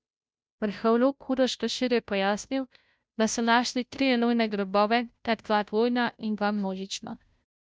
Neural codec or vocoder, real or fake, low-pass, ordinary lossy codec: codec, 16 kHz, 0.5 kbps, FunCodec, trained on Chinese and English, 25 frames a second; fake; none; none